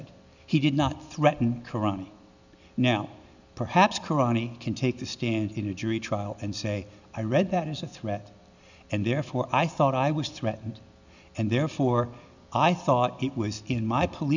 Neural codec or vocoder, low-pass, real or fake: none; 7.2 kHz; real